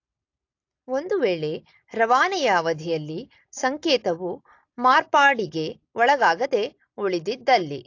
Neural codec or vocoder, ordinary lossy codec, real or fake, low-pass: none; AAC, 48 kbps; real; 7.2 kHz